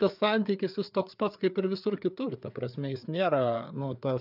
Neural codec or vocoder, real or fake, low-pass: codec, 16 kHz, 4 kbps, FreqCodec, larger model; fake; 5.4 kHz